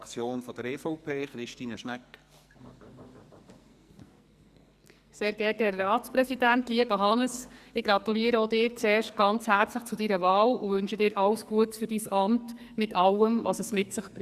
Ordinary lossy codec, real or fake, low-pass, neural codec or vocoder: Opus, 64 kbps; fake; 14.4 kHz; codec, 44.1 kHz, 2.6 kbps, SNAC